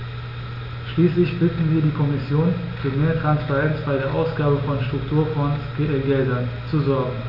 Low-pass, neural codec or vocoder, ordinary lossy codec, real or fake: 5.4 kHz; none; none; real